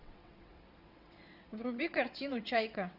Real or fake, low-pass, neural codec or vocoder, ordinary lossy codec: fake; 5.4 kHz; vocoder, 22.05 kHz, 80 mel bands, WaveNeXt; Opus, 64 kbps